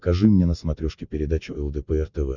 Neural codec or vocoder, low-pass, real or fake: none; 7.2 kHz; real